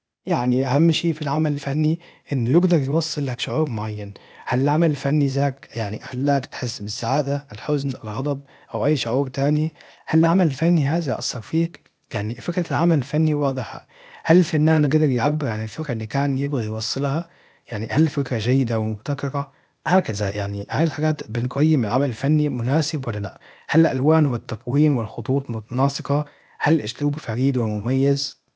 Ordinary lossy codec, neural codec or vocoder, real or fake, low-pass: none; codec, 16 kHz, 0.8 kbps, ZipCodec; fake; none